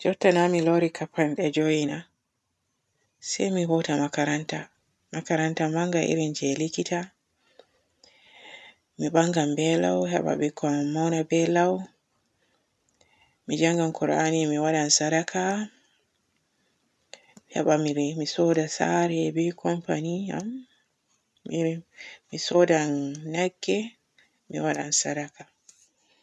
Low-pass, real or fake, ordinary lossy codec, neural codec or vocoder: none; real; none; none